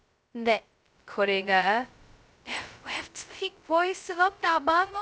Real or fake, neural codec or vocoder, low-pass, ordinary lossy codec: fake; codec, 16 kHz, 0.2 kbps, FocalCodec; none; none